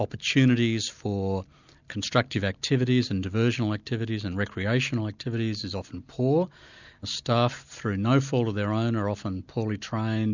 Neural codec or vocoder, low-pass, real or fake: none; 7.2 kHz; real